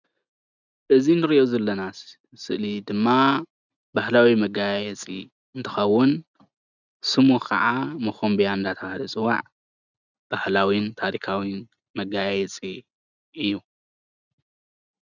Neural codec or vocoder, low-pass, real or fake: none; 7.2 kHz; real